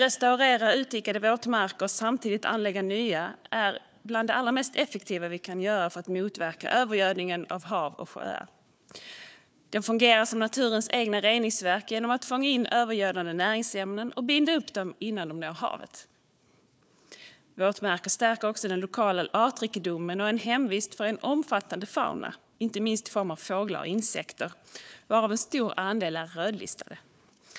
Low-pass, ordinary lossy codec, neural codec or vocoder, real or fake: none; none; codec, 16 kHz, 4 kbps, FunCodec, trained on Chinese and English, 50 frames a second; fake